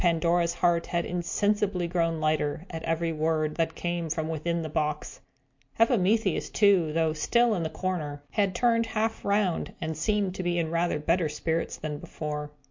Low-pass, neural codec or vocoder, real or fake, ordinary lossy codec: 7.2 kHz; none; real; MP3, 48 kbps